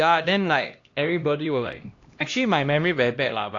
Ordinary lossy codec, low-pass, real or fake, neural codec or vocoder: AAC, 48 kbps; 7.2 kHz; fake; codec, 16 kHz, 1 kbps, X-Codec, HuBERT features, trained on LibriSpeech